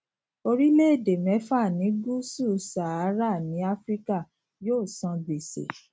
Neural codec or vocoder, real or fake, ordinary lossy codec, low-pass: none; real; none; none